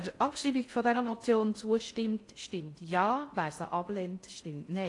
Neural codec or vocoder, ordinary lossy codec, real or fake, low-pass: codec, 16 kHz in and 24 kHz out, 0.6 kbps, FocalCodec, streaming, 4096 codes; AAC, 48 kbps; fake; 10.8 kHz